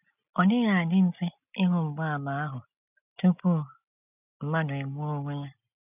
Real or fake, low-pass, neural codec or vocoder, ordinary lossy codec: real; 3.6 kHz; none; none